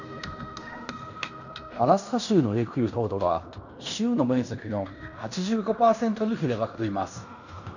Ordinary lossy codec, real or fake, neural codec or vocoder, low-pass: none; fake; codec, 16 kHz in and 24 kHz out, 0.9 kbps, LongCat-Audio-Codec, fine tuned four codebook decoder; 7.2 kHz